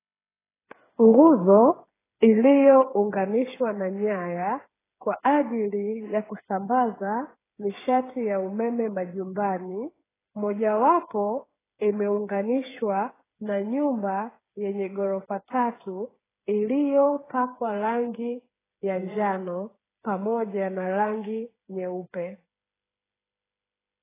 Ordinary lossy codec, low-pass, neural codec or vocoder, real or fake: AAC, 16 kbps; 3.6 kHz; codec, 16 kHz, 8 kbps, FreqCodec, smaller model; fake